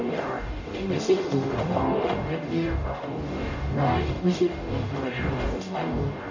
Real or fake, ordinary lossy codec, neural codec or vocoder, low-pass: fake; none; codec, 44.1 kHz, 0.9 kbps, DAC; 7.2 kHz